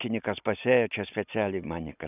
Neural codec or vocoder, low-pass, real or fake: none; 3.6 kHz; real